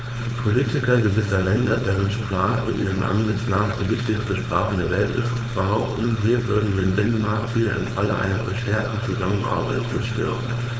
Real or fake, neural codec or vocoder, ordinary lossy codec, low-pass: fake; codec, 16 kHz, 4.8 kbps, FACodec; none; none